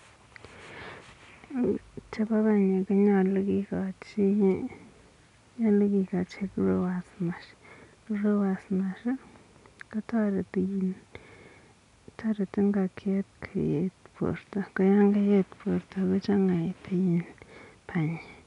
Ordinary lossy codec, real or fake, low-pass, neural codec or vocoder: none; real; 10.8 kHz; none